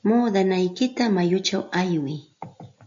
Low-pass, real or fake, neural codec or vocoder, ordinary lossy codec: 7.2 kHz; real; none; AAC, 64 kbps